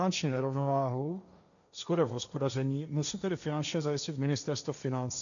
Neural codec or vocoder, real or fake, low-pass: codec, 16 kHz, 1.1 kbps, Voila-Tokenizer; fake; 7.2 kHz